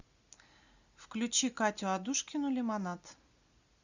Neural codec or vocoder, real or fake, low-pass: none; real; 7.2 kHz